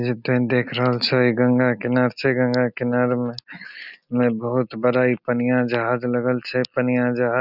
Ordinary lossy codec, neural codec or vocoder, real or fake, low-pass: none; none; real; 5.4 kHz